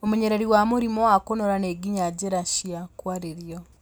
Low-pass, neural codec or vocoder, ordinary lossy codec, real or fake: none; none; none; real